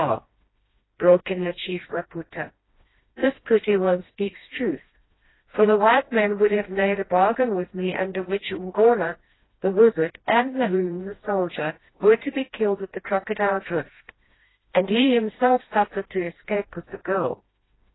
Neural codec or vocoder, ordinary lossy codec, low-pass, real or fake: codec, 16 kHz, 1 kbps, FreqCodec, smaller model; AAC, 16 kbps; 7.2 kHz; fake